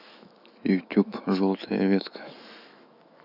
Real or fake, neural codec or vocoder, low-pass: fake; autoencoder, 48 kHz, 128 numbers a frame, DAC-VAE, trained on Japanese speech; 5.4 kHz